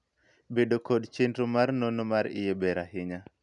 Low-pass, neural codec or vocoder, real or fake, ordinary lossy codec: 10.8 kHz; none; real; none